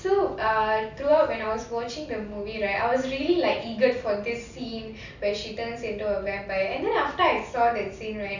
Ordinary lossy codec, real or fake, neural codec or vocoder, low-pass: none; real; none; 7.2 kHz